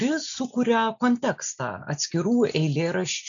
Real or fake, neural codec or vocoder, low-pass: real; none; 7.2 kHz